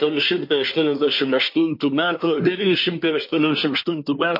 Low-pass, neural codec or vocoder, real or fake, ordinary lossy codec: 5.4 kHz; codec, 24 kHz, 1 kbps, SNAC; fake; MP3, 32 kbps